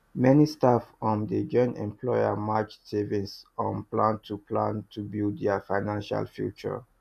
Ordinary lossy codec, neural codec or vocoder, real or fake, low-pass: MP3, 96 kbps; none; real; 14.4 kHz